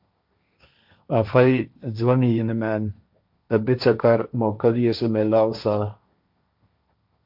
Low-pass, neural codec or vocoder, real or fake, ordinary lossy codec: 5.4 kHz; codec, 16 kHz, 1.1 kbps, Voila-Tokenizer; fake; MP3, 48 kbps